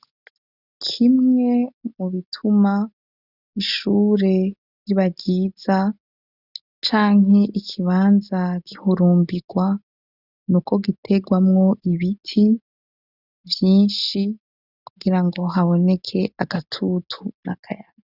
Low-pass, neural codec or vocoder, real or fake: 5.4 kHz; none; real